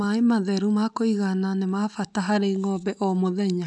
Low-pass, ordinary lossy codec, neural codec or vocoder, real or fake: 10.8 kHz; none; none; real